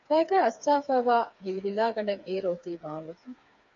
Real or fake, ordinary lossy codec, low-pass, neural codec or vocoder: fake; AAC, 48 kbps; 7.2 kHz; codec, 16 kHz, 4 kbps, FreqCodec, smaller model